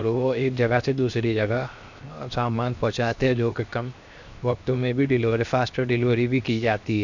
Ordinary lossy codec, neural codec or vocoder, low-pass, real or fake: none; codec, 16 kHz, 0.7 kbps, FocalCodec; 7.2 kHz; fake